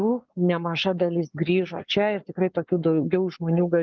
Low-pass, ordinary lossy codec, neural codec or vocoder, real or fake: 7.2 kHz; Opus, 24 kbps; codec, 44.1 kHz, 7.8 kbps, Pupu-Codec; fake